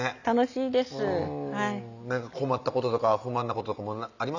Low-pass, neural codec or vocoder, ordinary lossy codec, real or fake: 7.2 kHz; none; none; real